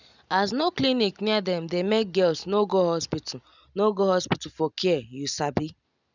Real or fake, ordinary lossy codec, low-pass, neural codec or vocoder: real; none; 7.2 kHz; none